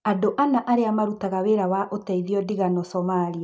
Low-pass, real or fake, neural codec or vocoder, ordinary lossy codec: none; real; none; none